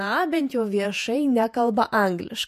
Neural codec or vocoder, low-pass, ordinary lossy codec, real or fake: vocoder, 48 kHz, 128 mel bands, Vocos; 14.4 kHz; MP3, 64 kbps; fake